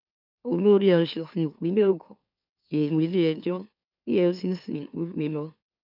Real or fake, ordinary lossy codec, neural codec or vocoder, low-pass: fake; none; autoencoder, 44.1 kHz, a latent of 192 numbers a frame, MeloTTS; 5.4 kHz